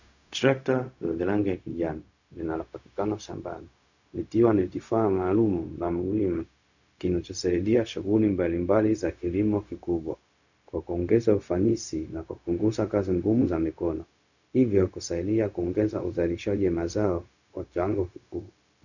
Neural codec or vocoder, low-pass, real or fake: codec, 16 kHz, 0.4 kbps, LongCat-Audio-Codec; 7.2 kHz; fake